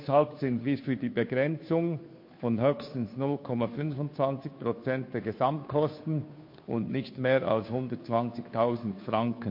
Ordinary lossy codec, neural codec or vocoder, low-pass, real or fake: MP3, 32 kbps; codec, 16 kHz, 2 kbps, FunCodec, trained on Chinese and English, 25 frames a second; 5.4 kHz; fake